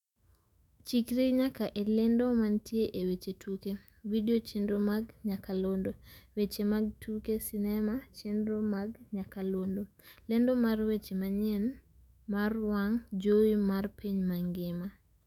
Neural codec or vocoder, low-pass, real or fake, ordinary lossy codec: autoencoder, 48 kHz, 128 numbers a frame, DAC-VAE, trained on Japanese speech; 19.8 kHz; fake; none